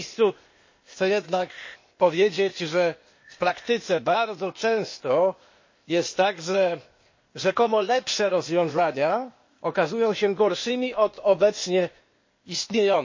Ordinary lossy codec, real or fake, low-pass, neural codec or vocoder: MP3, 32 kbps; fake; 7.2 kHz; codec, 16 kHz, 0.8 kbps, ZipCodec